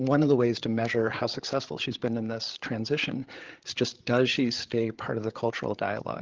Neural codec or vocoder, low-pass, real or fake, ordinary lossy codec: codec, 16 kHz, 8 kbps, FreqCodec, larger model; 7.2 kHz; fake; Opus, 16 kbps